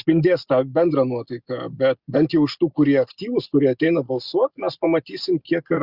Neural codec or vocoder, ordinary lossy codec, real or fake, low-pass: none; Opus, 64 kbps; real; 5.4 kHz